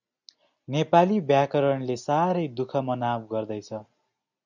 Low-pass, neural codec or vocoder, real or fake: 7.2 kHz; none; real